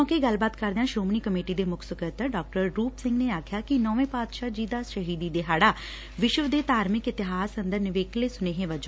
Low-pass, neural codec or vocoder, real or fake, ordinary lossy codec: none; none; real; none